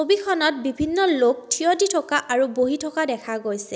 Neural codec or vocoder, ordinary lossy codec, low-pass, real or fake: none; none; none; real